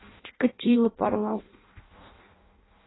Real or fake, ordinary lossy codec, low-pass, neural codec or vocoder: fake; AAC, 16 kbps; 7.2 kHz; codec, 16 kHz in and 24 kHz out, 0.6 kbps, FireRedTTS-2 codec